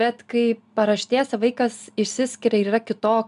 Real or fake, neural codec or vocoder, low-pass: real; none; 10.8 kHz